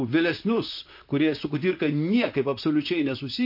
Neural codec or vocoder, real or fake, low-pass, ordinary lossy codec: vocoder, 44.1 kHz, 128 mel bands, Pupu-Vocoder; fake; 5.4 kHz; MP3, 32 kbps